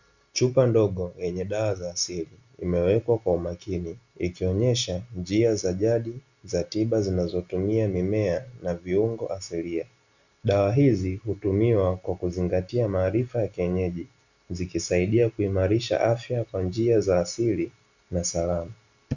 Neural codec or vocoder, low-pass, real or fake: none; 7.2 kHz; real